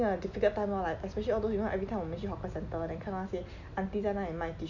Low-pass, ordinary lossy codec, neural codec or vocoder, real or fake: 7.2 kHz; none; none; real